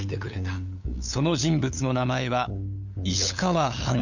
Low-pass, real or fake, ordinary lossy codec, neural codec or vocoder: 7.2 kHz; fake; none; codec, 16 kHz, 8 kbps, FunCodec, trained on LibriTTS, 25 frames a second